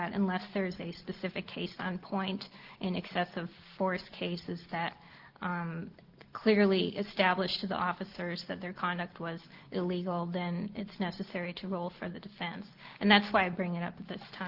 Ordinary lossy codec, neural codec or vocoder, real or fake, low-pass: Opus, 16 kbps; none; real; 5.4 kHz